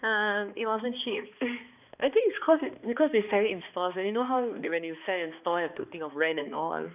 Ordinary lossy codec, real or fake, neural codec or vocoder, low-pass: none; fake; codec, 16 kHz, 2 kbps, X-Codec, HuBERT features, trained on balanced general audio; 3.6 kHz